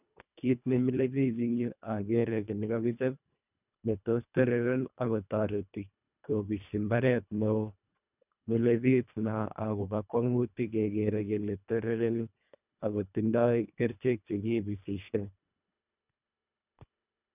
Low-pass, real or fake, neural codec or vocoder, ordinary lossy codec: 3.6 kHz; fake; codec, 24 kHz, 1.5 kbps, HILCodec; none